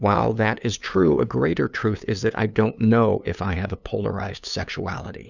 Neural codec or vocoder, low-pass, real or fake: codec, 16 kHz, 2 kbps, FunCodec, trained on LibriTTS, 25 frames a second; 7.2 kHz; fake